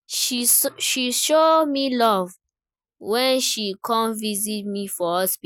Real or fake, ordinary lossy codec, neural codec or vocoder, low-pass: real; none; none; none